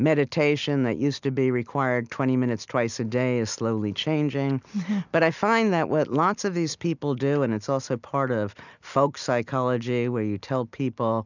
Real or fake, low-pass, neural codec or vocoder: real; 7.2 kHz; none